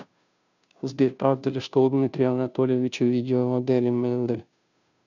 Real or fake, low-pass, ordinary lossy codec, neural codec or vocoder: fake; 7.2 kHz; none; codec, 16 kHz, 0.5 kbps, FunCodec, trained on Chinese and English, 25 frames a second